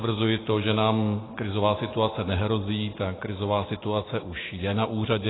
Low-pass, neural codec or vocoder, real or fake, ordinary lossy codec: 7.2 kHz; none; real; AAC, 16 kbps